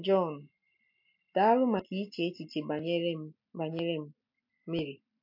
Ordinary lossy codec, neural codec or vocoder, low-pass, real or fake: MP3, 32 kbps; none; 5.4 kHz; real